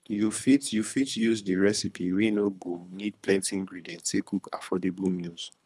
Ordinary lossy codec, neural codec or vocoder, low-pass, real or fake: none; codec, 24 kHz, 3 kbps, HILCodec; none; fake